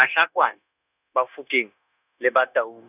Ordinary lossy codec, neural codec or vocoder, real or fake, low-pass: none; codec, 16 kHz, 0.9 kbps, LongCat-Audio-Codec; fake; 3.6 kHz